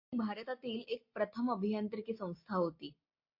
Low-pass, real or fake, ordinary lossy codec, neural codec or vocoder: 5.4 kHz; real; MP3, 32 kbps; none